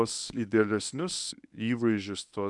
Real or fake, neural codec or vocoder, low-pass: fake; codec, 24 kHz, 0.9 kbps, WavTokenizer, medium speech release version 1; 10.8 kHz